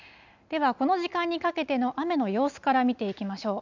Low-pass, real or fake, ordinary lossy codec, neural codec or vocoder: 7.2 kHz; real; none; none